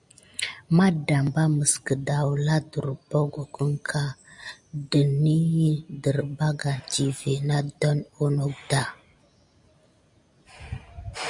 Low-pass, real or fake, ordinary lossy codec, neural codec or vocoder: 10.8 kHz; real; MP3, 96 kbps; none